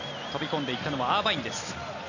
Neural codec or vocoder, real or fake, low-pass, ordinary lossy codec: none; real; 7.2 kHz; none